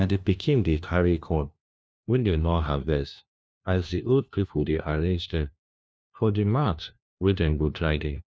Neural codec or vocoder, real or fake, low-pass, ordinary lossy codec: codec, 16 kHz, 1 kbps, FunCodec, trained on LibriTTS, 50 frames a second; fake; none; none